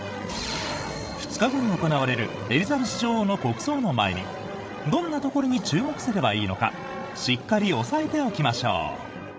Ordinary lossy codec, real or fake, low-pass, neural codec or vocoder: none; fake; none; codec, 16 kHz, 16 kbps, FreqCodec, larger model